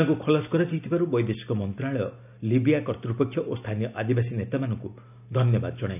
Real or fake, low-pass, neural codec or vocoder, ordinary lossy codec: real; 3.6 kHz; none; none